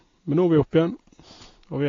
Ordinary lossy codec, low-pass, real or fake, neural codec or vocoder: AAC, 32 kbps; 7.2 kHz; real; none